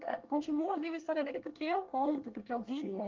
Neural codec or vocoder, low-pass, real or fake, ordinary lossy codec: codec, 24 kHz, 1 kbps, SNAC; 7.2 kHz; fake; Opus, 32 kbps